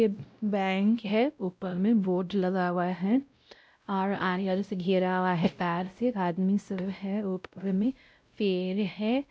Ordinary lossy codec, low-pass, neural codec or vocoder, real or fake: none; none; codec, 16 kHz, 0.5 kbps, X-Codec, WavLM features, trained on Multilingual LibriSpeech; fake